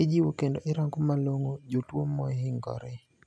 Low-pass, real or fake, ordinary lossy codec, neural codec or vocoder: none; real; none; none